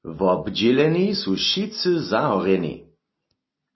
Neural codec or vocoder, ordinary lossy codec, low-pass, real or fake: none; MP3, 24 kbps; 7.2 kHz; real